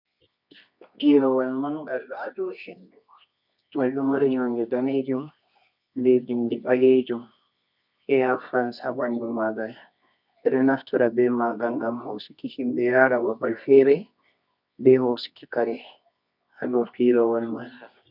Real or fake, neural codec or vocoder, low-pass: fake; codec, 24 kHz, 0.9 kbps, WavTokenizer, medium music audio release; 5.4 kHz